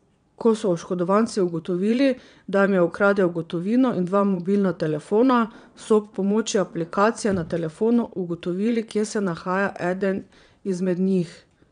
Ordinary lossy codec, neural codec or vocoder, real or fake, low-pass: none; vocoder, 22.05 kHz, 80 mel bands, Vocos; fake; 9.9 kHz